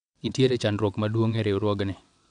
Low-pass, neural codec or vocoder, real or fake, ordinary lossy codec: 9.9 kHz; vocoder, 22.05 kHz, 80 mel bands, WaveNeXt; fake; none